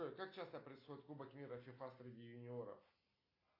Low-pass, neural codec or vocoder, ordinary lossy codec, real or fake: 5.4 kHz; none; AAC, 24 kbps; real